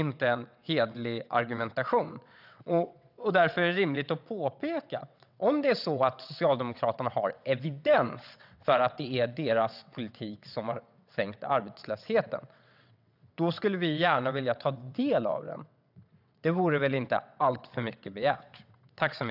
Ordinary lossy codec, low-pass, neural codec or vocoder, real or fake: none; 5.4 kHz; vocoder, 22.05 kHz, 80 mel bands, WaveNeXt; fake